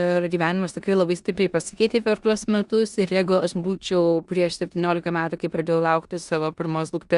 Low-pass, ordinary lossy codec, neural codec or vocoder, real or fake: 10.8 kHz; Opus, 64 kbps; codec, 16 kHz in and 24 kHz out, 0.9 kbps, LongCat-Audio-Codec, four codebook decoder; fake